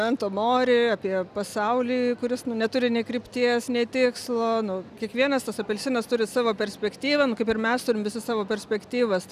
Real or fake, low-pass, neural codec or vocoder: real; 14.4 kHz; none